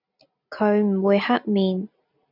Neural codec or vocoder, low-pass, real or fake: none; 5.4 kHz; real